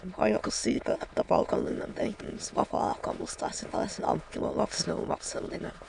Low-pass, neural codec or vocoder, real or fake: 9.9 kHz; autoencoder, 22.05 kHz, a latent of 192 numbers a frame, VITS, trained on many speakers; fake